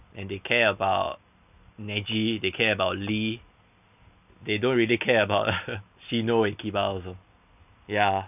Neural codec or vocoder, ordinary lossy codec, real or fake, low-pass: none; none; real; 3.6 kHz